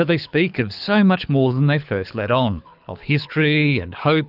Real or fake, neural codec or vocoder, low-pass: fake; codec, 24 kHz, 6 kbps, HILCodec; 5.4 kHz